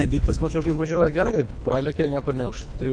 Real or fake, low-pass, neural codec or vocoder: fake; 9.9 kHz; codec, 24 kHz, 1.5 kbps, HILCodec